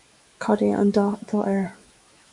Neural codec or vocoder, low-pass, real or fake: autoencoder, 48 kHz, 128 numbers a frame, DAC-VAE, trained on Japanese speech; 10.8 kHz; fake